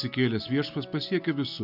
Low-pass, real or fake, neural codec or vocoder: 5.4 kHz; real; none